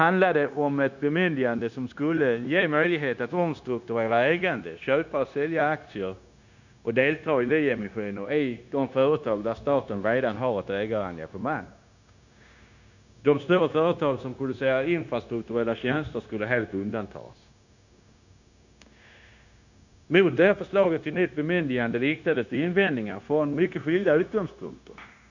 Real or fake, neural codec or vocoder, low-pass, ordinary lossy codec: fake; codec, 16 kHz, 0.9 kbps, LongCat-Audio-Codec; 7.2 kHz; none